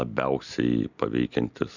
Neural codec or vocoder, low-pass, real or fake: none; 7.2 kHz; real